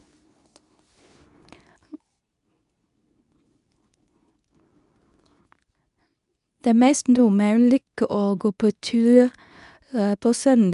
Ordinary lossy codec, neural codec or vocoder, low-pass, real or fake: none; codec, 24 kHz, 0.9 kbps, WavTokenizer, medium speech release version 2; 10.8 kHz; fake